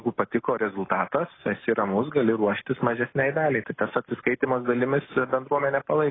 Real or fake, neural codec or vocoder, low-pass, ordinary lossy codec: real; none; 7.2 kHz; AAC, 16 kbps